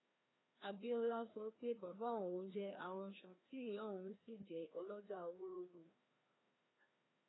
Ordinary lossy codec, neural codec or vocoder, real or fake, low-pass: AAC, 16 kbps; codec, 16 kHz, 1 kbps, FreqCodec, larger model; fake; 7.2 kHz